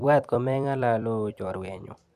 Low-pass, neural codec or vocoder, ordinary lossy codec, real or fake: 14.4 kHz; vocoder, 44.1 kHz, 128 mel bands every 256 samples, BigVGAN v2; none; fake